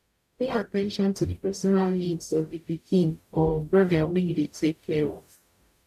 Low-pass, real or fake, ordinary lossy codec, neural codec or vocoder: 14.4 kHz; fake; none; codec, 44.1 kHz, 0.9 kbps, DAC